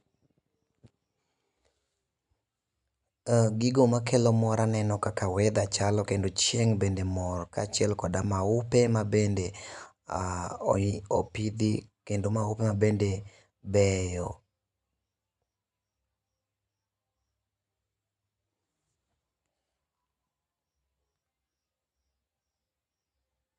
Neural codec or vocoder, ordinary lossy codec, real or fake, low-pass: none; none; real; 10.8 kHz